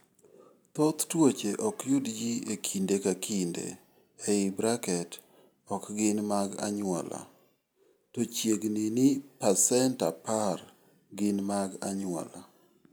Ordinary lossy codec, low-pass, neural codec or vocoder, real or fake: none; none; none; real